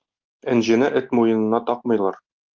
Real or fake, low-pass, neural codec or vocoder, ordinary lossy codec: real; 7.2 kHz; none; Opus, 24 kbps